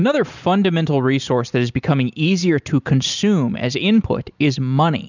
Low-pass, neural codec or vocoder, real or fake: 7.2 kHz; none; real